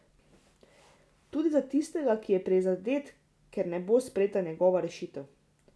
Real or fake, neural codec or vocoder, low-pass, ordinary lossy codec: real; none; none; none